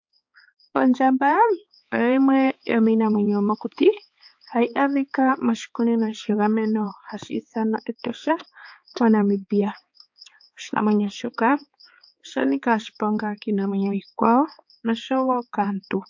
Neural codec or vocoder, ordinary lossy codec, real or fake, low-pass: codec, 16 kHz, 4 kbps, X-Codec, HuBERT features, trained on balanced general audio; MP3, 48 kbps; fake; 7.2 kHz